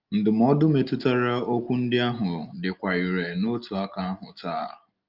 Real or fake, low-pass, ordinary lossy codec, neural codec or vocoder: real; 5.4 kHz; Opus, 24 kbps; none